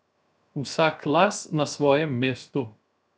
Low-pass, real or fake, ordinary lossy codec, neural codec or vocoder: none; fake; none; codec, 16 kHz, 0.7 kbps, FocalCodec